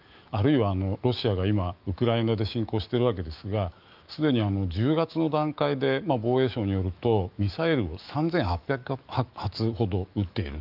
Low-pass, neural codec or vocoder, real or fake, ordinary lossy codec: 5.4 kHz; autoencoder, 48 kHz, 128 numbers a frame, DAC-VAE, trained on Japanese speech; fake; Opus, 24 kbps